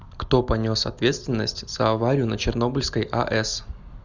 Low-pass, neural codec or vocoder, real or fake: 7.2 kHz; none; real